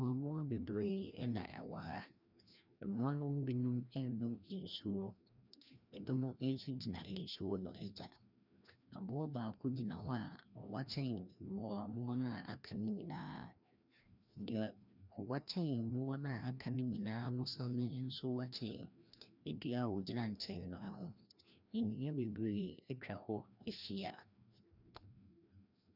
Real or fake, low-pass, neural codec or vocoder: fake; 5.4 kHz; codec, 16 kHz, 1 kbps, FreqCodec, larger model